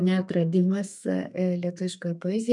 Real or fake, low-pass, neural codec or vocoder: fake; 10.8 kHz; codec, 32 kHz, 1.9 kbps, SNAC